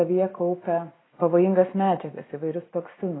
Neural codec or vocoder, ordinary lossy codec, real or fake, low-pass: none; AAC, 16 kbps; real; 7.2 kHz